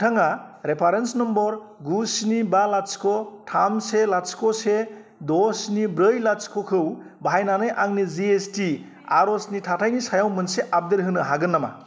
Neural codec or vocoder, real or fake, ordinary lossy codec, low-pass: none; real; none; none